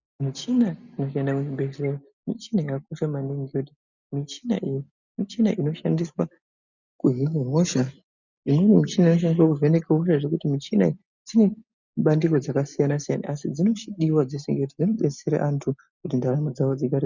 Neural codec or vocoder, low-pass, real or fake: none; 7.2 kHz; real